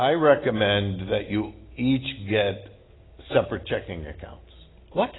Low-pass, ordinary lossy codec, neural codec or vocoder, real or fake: 7.2 kHz; AAC, 16 kbps; none; real